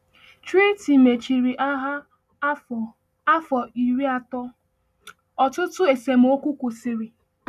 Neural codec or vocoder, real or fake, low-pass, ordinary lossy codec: none; real; 14.4 kHz; none